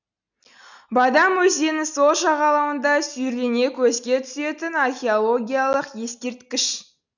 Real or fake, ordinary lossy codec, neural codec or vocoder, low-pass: real; none; none; 7.2 kHz